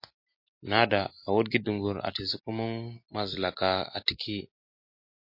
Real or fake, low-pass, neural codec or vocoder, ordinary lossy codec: real; 5.4 kHz; none; MP3, 32 kbps